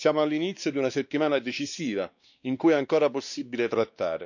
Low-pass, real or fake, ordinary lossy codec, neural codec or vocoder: 7.2 kHz; fake; none; codec, 16 kHz, 2 kbps, X-Codec, WavLM features, trained on Multilingual LibriSpeech